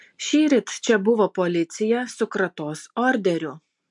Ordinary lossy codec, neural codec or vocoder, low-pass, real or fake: MP3, 64 kbps; none; 10.8 kHz; real